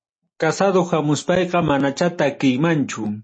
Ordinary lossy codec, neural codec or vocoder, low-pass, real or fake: MP3, 32 kbps; none; 9.9 kHz; real